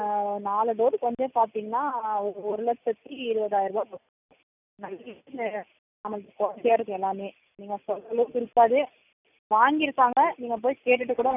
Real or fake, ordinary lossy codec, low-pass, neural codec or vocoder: real; none; 3.6 kHz; none